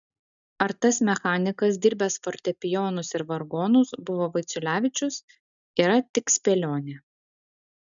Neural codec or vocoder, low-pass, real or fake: none; 7.2 kHz; real